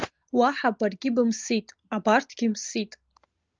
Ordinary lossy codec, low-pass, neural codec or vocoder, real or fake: Opus, 24 kbps; 7.2 kHz; none; real